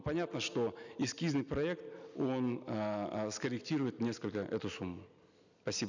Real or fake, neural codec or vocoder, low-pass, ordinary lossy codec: real; none; 7.2 kHz; none